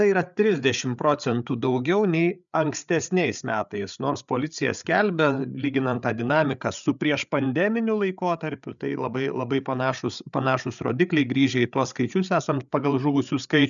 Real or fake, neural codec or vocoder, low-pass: fake; codec, 16 kHz, 8 kbps, FreqCodec, larger model; 7.2 kHz